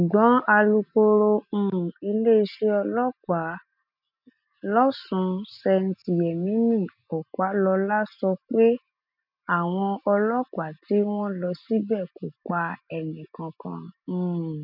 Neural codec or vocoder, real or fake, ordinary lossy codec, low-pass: none; real; none; 5.4 kHz